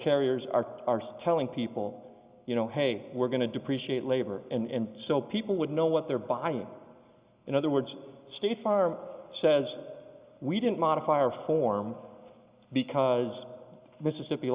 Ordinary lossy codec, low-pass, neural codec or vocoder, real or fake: Opus, 64 kbps; 3.6 kHz; none; real